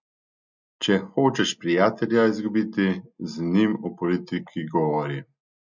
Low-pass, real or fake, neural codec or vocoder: 7.2 kHz; real; none